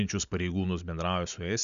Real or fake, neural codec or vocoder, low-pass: real; none; 7.2 kHz